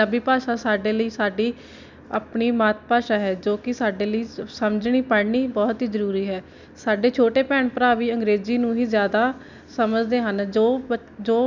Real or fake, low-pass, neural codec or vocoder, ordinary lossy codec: real; 7.2 kHz; none; none